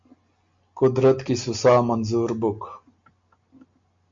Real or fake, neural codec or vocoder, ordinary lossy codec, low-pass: real; none; MP3, 48 kbps; 7.2 kHz